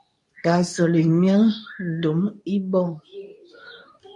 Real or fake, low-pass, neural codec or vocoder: fake; 10.8 kHz; codec, 24 kHz, 0.9 kbps, WavTokenizer, medium speech release version 2